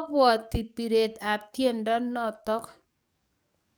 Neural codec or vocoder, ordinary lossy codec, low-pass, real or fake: codec, 44.1 kHz, 7.8 kbps, DAC; none; none; fake